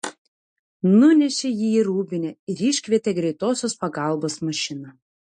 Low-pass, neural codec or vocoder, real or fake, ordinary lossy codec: 9.9 kHz; none; real; MP3, 48 kbps